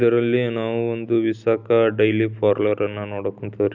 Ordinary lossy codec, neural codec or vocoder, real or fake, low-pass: none; none; real; 7.2 kHz